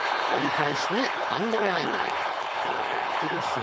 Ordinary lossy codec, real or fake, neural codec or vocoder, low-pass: none; fake; codec, 16 kHz, 4.8 kbps, FACodec; none